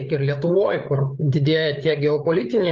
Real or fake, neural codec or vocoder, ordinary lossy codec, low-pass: fake; codec, 16 kHz, 8 kbps, FreqCodec, larger model; Opus, 32 kbps; 7.2 kHz